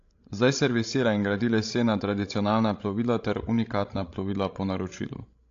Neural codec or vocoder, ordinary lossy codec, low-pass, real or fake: codec, 16 kHz, 16 kbps, FreqCodec, larger model; AAC, 48 kbps; 7.2 kHz; fake